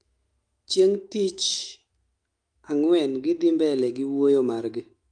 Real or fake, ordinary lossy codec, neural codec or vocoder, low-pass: fake; Opus, 32 kbps; autoencoder, 48 kHz, 128 numbers a frame, DAC-VAE, trained on Japanese speech; 9.9 kHz